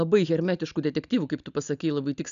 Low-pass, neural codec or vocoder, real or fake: 7.2 kHz; none; real